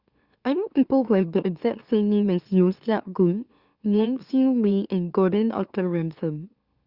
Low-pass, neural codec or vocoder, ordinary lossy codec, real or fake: 5.4 kHz; autoencoder, 44.1 kHz, a latent of 192 numbers a frame, MeloTTS; Opus, 64 kbps; fake